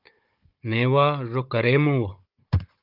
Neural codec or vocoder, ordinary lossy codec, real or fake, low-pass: codec, 16 kHz, 16 kbps, FunCodec, trained on Chinese and English, 50 frames a second; Opus, 32 kbps; fake; 5.4 kHz